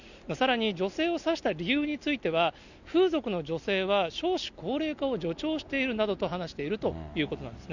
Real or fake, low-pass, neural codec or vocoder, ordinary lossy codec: real; 7.2 kHz; none; none